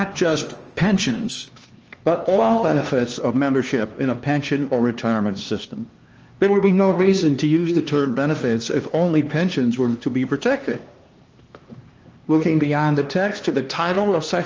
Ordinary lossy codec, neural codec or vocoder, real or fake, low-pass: Opus, 24 kbps; codec, 16 kHz, 2 kbps, X-Codec, HuBERT features, trained on LibriSpeech; fake; 7.2 kHz